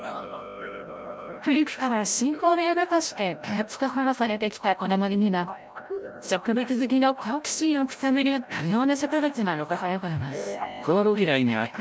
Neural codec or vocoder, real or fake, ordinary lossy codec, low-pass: codec, 16 kHz, 0.5 kbps, FreqCodec, larger model; fake; none; none